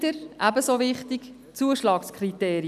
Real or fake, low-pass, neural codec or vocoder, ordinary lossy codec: real; 14.4 kHz; none; none